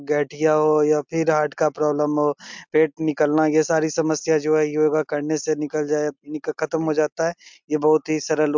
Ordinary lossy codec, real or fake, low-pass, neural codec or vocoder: MP3, 64 kbps; real; 7.2 kHz; none